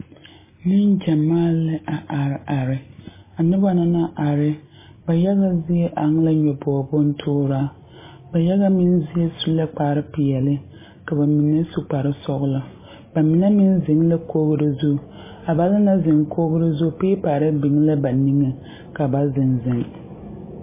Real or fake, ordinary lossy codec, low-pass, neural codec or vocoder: real; MP3, 16 kbps; 3.6 kHz; none